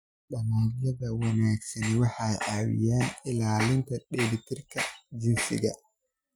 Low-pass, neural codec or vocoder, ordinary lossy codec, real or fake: 19.8 kHz; none; none; real